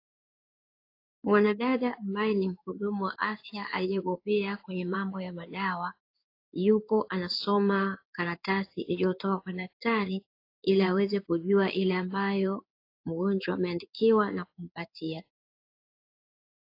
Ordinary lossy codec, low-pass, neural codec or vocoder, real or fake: AAC, 32 kbps; 5.4 kHz; codec, 16 kHz in and 24 kHz out, 1 kbps, XY-Tokenizer; fake